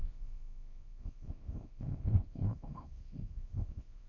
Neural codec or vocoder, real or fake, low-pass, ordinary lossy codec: codec, 24 kHz, 0.9 kbps, WavTokenizer, small release; fake; 7.2 kHz; none